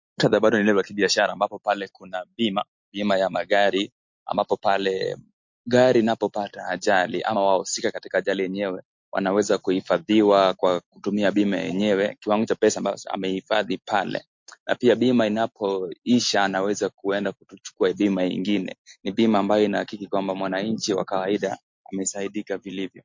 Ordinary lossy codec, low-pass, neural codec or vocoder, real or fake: MP3, 48 kbps; 7.2 kHz; none; real